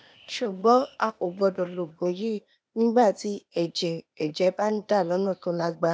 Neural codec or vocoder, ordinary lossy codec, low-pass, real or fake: codec, 16 kHz, 0.8 kbps, ZipCodec; none; none; fake